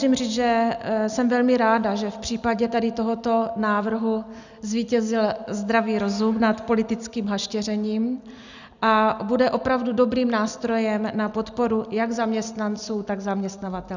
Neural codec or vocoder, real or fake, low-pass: none; real; 7.2 kHz